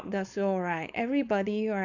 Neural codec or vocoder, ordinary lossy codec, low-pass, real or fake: codec, 16 kHz, 4.8 kbps, FACodec; none; 7.2 kHz; fake